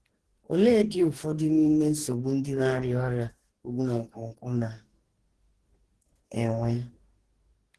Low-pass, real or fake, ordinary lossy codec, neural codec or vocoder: 10.8 kHz; fake; Opus, 16 kbps; codec, 44.1 kHz, 2.6 kbps, DAC